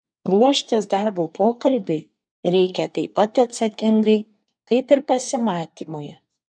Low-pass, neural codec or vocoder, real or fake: 9.9 kHz; codec, 24 kHz, 1 kbps, SNAC; fake